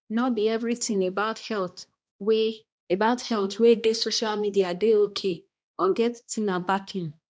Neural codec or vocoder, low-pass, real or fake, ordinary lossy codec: codec, 16 kHz, 1 kbps, X-Codec, HuBERT features, trained on balanced general audio; none; fake; none